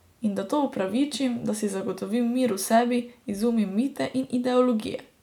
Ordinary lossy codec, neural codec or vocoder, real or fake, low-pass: none; none; real; 19.8 kHz